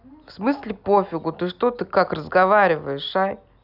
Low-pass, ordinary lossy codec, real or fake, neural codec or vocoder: 5.4 kHz; none; real; none